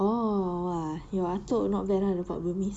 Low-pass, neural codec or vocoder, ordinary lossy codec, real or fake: 9.9 kHz; none; none; real